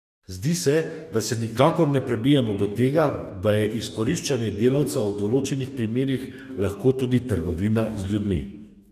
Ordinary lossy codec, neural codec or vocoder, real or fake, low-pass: none; codec, 44.1 kHz, 2.6 kbps, DAC; fake; 14.4 kHz